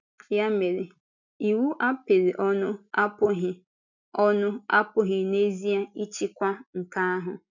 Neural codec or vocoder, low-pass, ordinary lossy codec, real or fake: none; 7.2 kHz; none; real